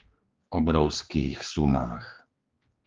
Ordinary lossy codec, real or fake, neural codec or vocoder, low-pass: Opus, 32 kbps; fake; codec, 16 kHz, 2 kbps, X-Codec, HuBERT features, trained on general audio; 7.2 kHz